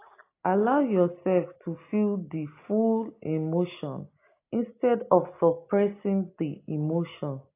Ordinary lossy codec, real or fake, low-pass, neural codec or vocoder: AAC, 24 kbps; real; 3.6 kHz; none